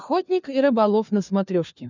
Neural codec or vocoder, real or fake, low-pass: codec, 16 kHz, 4 kbps, FunCodec, trained on LibriTTS, 50 frames a second; fake; 7.2 kHz